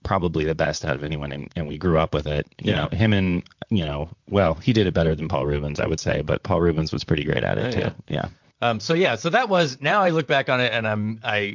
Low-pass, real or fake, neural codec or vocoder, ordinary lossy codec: 7.2 kHz; fake; vocoder, 44.1 kHz, 128 mel bands, Pupu-Vocoder; MP3, 64 kbps